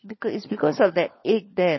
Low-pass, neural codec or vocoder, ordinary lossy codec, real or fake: 7.2 kHz; codec, 16 kHz, 16 kbps, FunCodec, trained on LibriTTS, 50 frames a second; MP3, 24 kbps; fake